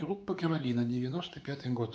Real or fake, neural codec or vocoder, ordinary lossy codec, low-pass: fake; codec, 16 kHz, 4 kbps, X-Codec, WavLM features, trained on Multilingual LibriSpeech; none; none